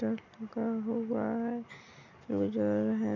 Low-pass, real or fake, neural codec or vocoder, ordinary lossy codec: 7.2 kHz; real; none; none